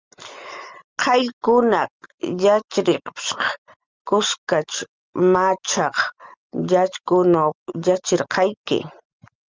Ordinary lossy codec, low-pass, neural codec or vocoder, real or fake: Opus, 32 kbps; 7.2 kHz; none; real